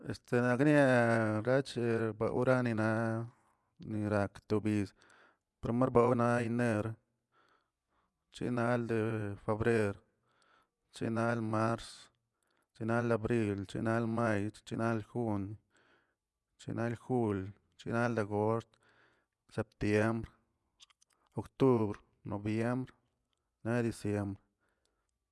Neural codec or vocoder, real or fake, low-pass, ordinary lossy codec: vocoder, 24 kHz, 100 mel bands, Vocos; fake; none; none